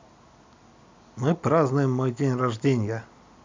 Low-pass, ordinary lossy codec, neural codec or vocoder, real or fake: 7.2 kHz; MP3, 64 kbps; none; real